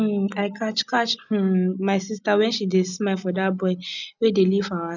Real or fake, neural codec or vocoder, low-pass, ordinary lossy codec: real; none; 7.2 kHz; none